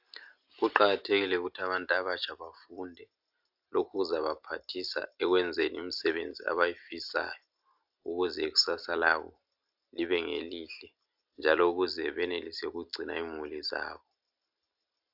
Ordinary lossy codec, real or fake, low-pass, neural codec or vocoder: AAC, 48 kbps; real; 5.4 kHz; none